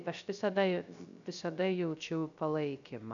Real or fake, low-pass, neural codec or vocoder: fake; 7.2 kHz; codec, 16 kHz, 0.3 kbps, FocalCodec